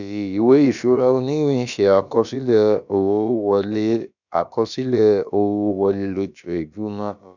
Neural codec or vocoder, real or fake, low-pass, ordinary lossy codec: codec, 16 kHz, about 1 kbps, DyCAST, with the encoder's durations; fake; 7.2 kHz; none